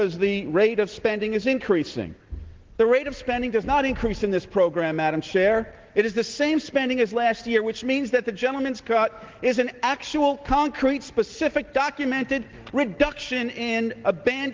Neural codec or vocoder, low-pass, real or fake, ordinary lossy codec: none; 7.2 kHz; real; Opus, 16 kbps